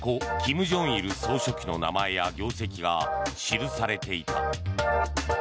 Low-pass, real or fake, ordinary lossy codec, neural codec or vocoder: none; real; none; none